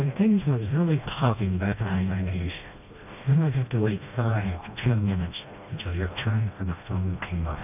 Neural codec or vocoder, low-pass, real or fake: codec, 16 kHz, 1 kbps, FreqCodec, smaller model; 3.6 kHz; fake